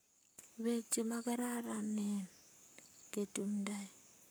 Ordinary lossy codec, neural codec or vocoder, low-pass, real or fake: none; codec, 44.1 kHz, 7.8 kbps, Pupu-Codec; none; fake